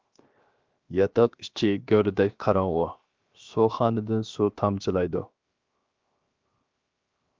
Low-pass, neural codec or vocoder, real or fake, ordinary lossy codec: 7.2 kHz; codec, 16 kHz, 0.7 kbps, FocalCodec; fake; Opus, 24 kbps